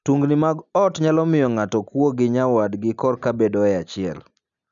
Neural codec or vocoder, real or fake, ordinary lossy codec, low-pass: none; real; none; 7.2 kHz